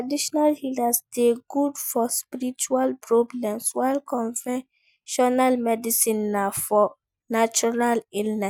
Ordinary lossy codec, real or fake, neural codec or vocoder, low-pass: none; real; none; none